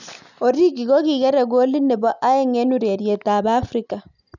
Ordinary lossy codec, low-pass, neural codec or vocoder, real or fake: none; 7.2 kHz; none; real